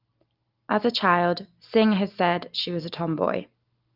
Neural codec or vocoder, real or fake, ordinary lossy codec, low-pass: none; real; Opus, 32 kbps; 5.4 kHz